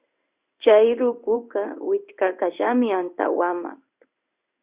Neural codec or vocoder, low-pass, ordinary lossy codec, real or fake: codec, 16 kHz in and 24 kHz out, 1 kbps, XY-Tokenizer; 3.6 kHz; Opus, 64 kbps; fake